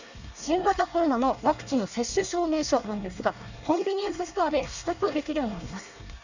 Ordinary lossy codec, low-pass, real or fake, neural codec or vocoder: none; 7.2 kHz; fake; codec, 24 kHz, 1 kbps, SNAC